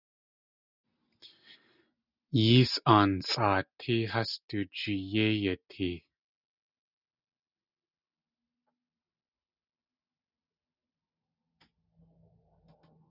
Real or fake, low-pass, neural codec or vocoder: real; 5.4 kHz; none